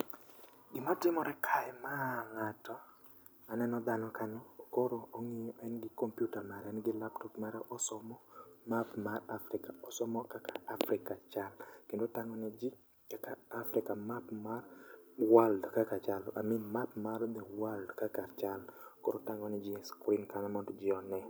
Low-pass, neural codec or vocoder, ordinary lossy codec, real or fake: none; none; none; real